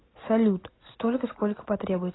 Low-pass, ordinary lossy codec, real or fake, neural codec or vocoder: 7.2 kHz; AAC, 16 kbps; real; none